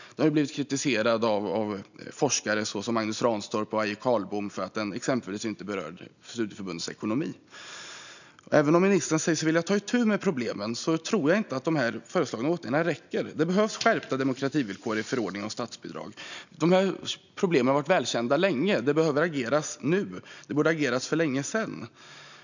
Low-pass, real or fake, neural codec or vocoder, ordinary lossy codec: 7.2 kHz; real; none; none